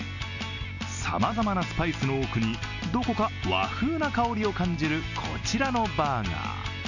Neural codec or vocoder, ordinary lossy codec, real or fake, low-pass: none; none; real; 7.2 kHz